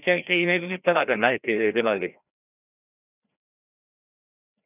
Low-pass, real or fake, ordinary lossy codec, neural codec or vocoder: 3.6 kHz; fake; none; codec, 16 kHz, 1 kbps, FreqCodec, larger model